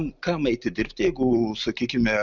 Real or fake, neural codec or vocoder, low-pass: real; none; 7.2 kHz